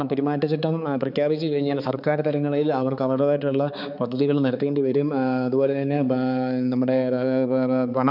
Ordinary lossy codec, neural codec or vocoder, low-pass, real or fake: none; codec, 16 kHz, 4 kbps, X-Codec, HuBERT features, trained on balanced general audio; 5.4 kHz; fake